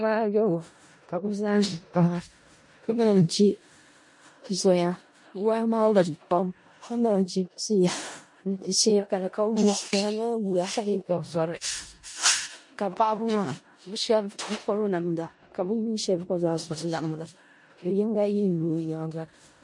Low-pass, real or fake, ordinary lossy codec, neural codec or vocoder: 10.8 kHz; fake; MP3, 48 kbps; codec, 16 kHz in and 24 kHz out, 0.4 kbps, LongCat-Audio-Codec, four codebook decoder